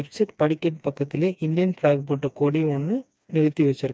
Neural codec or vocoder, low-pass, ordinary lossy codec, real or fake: codec, 16 kHz, 2 kbps, FreqCodec, smaller model; none; none; fake